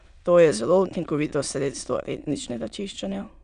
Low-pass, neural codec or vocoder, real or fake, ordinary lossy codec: 9.9 kHz; autoencoder, 22.05 kHz, a latent of 192 numbers a frame, VITS, trained on many speakers; fake; none